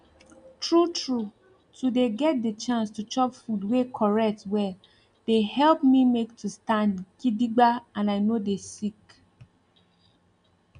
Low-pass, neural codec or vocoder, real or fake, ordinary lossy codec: 9.9 kHz; none; real; MP3, 96 kbps